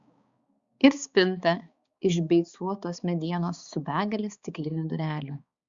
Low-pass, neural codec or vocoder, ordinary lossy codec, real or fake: 7.2 kHz; codec, 16 kHz, 4 kbps, X-Codec, HuBERT features, trained on balanced general audio; Opus, 64 kbps; fake